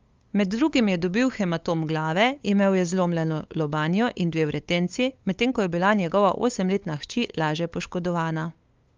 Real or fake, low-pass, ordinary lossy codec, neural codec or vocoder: fake; 7.2 kHz; Opus, 32 kbps; codec, 16 kHz, 8 kbps, FunCodec, trained on LibriTTS, 25 frames a second